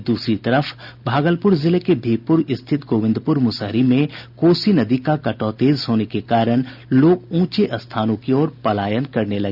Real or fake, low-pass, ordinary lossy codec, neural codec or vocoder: real; 5.4 kHz; none; none